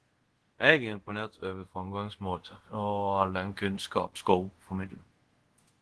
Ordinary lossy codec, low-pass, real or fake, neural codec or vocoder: Opus, 16 kbps; 10.8 kHz; fake; codec, 24 kHz, 0.5 kbps, DualCodec